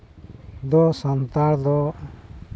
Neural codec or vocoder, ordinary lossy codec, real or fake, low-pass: none; none; real; none